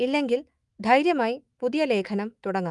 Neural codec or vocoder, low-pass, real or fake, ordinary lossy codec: autoencoder, 48 kHz, 128 numbers a frame, DAC-VAE, trained on Japanese speech; 10.8 kHz; fake; Opus, 32 kbps